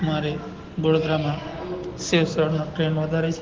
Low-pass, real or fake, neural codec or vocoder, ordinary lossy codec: 7.2 kHz; real; none; Opus, 16 kbps